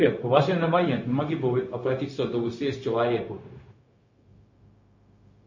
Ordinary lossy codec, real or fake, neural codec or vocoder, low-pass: MP3, 32 kbps; fake; codec, 16 kHz, 0.4 kbps, LongCat-Audio-Codec; 7.2 kHz